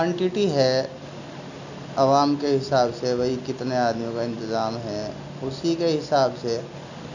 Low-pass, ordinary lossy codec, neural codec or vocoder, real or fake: 7.2 kHz; none; none; real